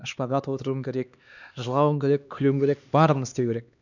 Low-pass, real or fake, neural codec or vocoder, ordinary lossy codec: 7.2 kHz; fake; codec, 16 kHz, 2 kbps, X-Codec, HuBERT features, trained on LibriSpeech; none